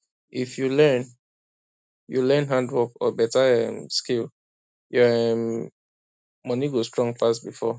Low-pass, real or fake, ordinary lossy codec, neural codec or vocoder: none; real; none; none